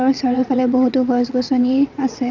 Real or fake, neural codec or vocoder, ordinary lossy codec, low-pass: fake; vocoder, 22.05 kHz, 80 mel bands, WaveNeXt; none; 7.2 kHz